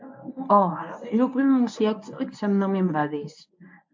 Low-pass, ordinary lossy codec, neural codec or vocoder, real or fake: 7.2 kHz; MP3, 48 kbps; codec, 24 kHz, 0.9 kbps, WavTokenizer, medium speech release version 1; fake